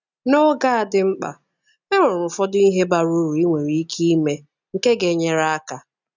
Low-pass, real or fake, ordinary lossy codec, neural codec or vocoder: 7.2 kHz; real; none; none